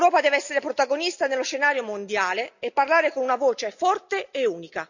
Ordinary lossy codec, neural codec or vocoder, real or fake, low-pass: none; none; real; 7.2 kHz